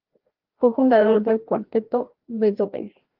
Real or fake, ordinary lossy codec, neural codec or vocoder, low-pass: fake; Opus, 16 kbps; codec, 16 kHz, 1 kbps, FreqCodec, larger model; 5.4 kHz